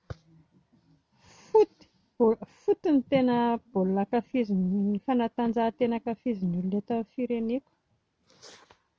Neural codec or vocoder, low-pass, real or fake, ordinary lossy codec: none; none; real; none